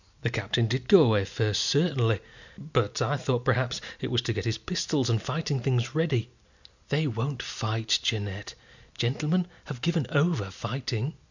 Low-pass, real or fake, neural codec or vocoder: 7.2 kHz; real; none